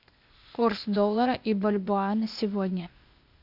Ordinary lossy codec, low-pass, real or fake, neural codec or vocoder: AAC, 48 kbps; 5.4 kHz; fake; codec, 16 kHz, 0.8 kbps, ZipCodec